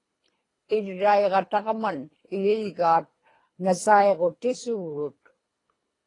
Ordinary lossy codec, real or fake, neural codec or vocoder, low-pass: AAC, 32 kbps; fake; codec, 24 kHz, 3 kbps, HILCodec; 10.8 kHz